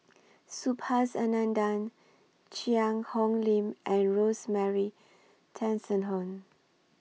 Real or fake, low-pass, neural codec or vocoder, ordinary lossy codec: real; none; none; none